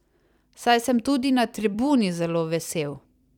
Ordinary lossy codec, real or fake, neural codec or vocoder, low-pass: none; fake; vocoder, 44.1 kHz, 128 mel bands every 256 samples, BigVGAN v2; 19.8 kHz